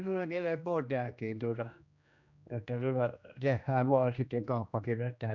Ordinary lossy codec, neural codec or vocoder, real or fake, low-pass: none; codec, 16 kHz, 1 kbps, X-Codec, HuBERT features, trained on general audio; fake; 7.2 kHz